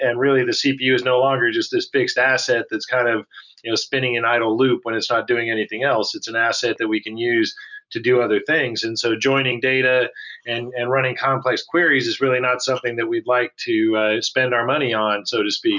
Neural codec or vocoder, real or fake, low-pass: none; real; 7.2 kHz